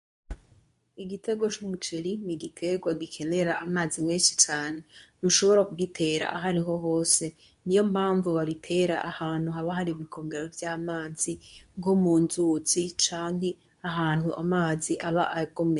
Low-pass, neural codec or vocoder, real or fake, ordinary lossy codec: 10.8 kHz; codec, 24 kHz, 0.9 kbps, WavTokenizer, medium speech release version 2; fake; MP3, 64 kbps